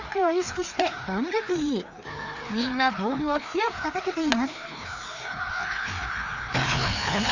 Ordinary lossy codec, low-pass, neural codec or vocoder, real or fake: none; 7.2 kHz; codec, 16 kHz, 2 kbps, FreqCodec, larger model; fake